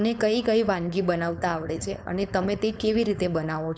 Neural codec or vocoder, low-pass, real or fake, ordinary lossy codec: codec, 16 kHz, 4.8 kbps, FACodec; none; fake; none